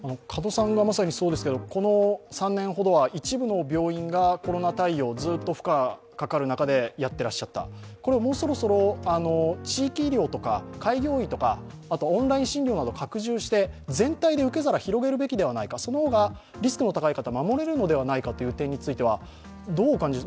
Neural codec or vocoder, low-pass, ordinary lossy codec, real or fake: none; none; none; real